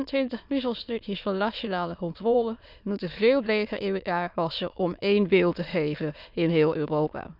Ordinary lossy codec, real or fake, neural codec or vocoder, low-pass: none; fake; autoencoder, 22.05 kHz, a latent of 192 numbers a frame, VITS, trained on many speakers; 5.4 kHz